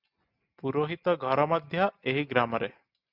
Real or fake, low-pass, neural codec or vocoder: real; 5.4 kHz; none